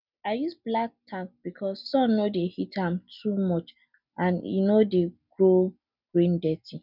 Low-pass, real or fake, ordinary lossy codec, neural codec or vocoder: 5.4 kHz; real; none; none